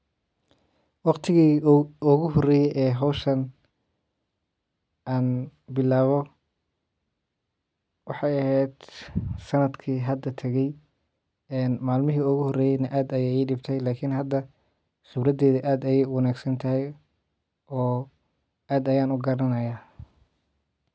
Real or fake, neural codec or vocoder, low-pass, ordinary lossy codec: real; none; none; none